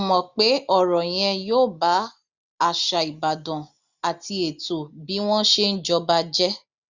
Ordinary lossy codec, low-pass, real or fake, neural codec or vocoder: Opus, 64 kbps; 7.2 kHz; real; none